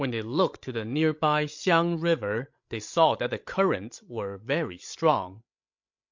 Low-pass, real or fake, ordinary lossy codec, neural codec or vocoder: 7.2 kHz; fake; MP3, 48 kbps; codec, 16 kHz, 16 kbps, FreqCodec, larger model